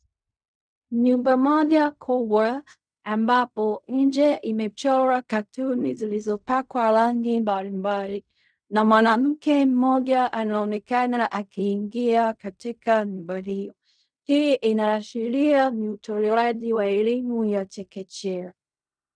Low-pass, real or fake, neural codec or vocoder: 9.9 kHz; fake; codec, 16 kHz in and 24 kHz out, 0.4 kbps, LongCat-Audio-Codec, fine tuned four codebook decoder